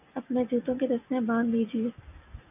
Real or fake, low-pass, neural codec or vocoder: real; 3.6 kHz; none